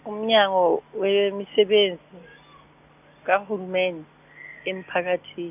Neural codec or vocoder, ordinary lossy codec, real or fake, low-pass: none; none; real; 3.6 kHz